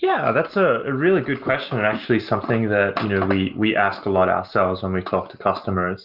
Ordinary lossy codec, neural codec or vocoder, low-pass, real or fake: Opus, 24 kbps; none; 5.4 kHz; real